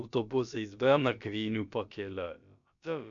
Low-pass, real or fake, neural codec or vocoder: 7.2 kHz; fake; codec, 16 kHz, about 1 kbps, DyCAST, with the encoder's durations